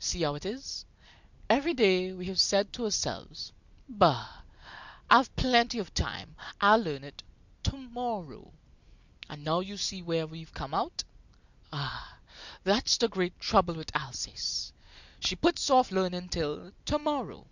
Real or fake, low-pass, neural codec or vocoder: real; 7.2 kHz; none